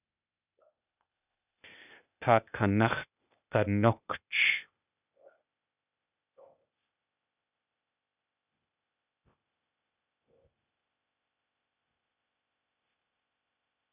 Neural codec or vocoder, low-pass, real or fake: codec, 16 kHz, 0.8 kbps, ZipCodec; 3.6 kHz; fake